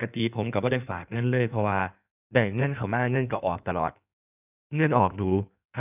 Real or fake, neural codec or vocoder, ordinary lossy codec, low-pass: fake; codec, 16 kHz in and 24 kHz out, 1.1 kbps, FireRedTTS-2 codec; none; 3.6 kHz